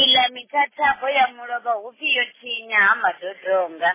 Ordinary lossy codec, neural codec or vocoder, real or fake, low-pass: MP3, 16 kbps; none; real; 3.6 kHz